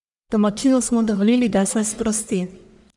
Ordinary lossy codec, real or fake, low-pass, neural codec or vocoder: none; fake; 10.8 kHz; codec, 44.1 kHz, 1.7 kbps, Pupu-Codec